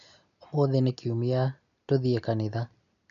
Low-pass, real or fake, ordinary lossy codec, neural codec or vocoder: 7.2 kHz; real; none; none